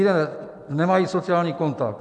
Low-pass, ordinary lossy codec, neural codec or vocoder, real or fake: 10.8 kHz; AAC, 64 kbps; none; real